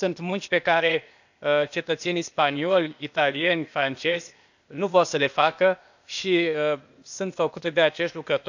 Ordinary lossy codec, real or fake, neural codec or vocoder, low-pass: none; fake; codec, 16 kHz, 0.8 kbps, ZipCodec; 7.2 kHz